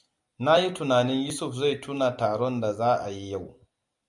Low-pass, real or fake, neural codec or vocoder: 10.8 kHz; real; none